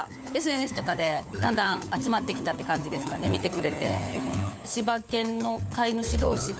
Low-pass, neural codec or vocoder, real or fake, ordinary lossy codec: none; codec, 16 kHz, 4 kbps, FunCodec, trained on LibriTTS, 50 frames a second; fake; none